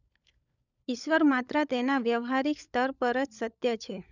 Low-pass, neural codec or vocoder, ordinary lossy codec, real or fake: 7.2 kHz; codec, 16 kHz, 16 kbps, FunCodec, trained on LibriTTS, 50 frames a second; none; fake